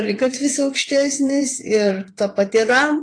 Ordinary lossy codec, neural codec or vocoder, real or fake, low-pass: AAC, 48 kbps; vocoder, 22.05 kHz, 80 mel bands, WaveNeXt; fake; 9.9 kHz